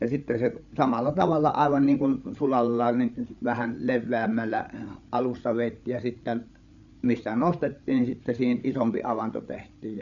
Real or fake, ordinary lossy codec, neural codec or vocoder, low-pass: fake; none; codec, 16 kHz, 8 kbps, FreqCodec, larger model; 7.2 kHz